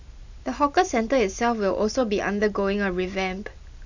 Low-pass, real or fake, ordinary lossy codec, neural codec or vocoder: 7.2 kHz; real; none; none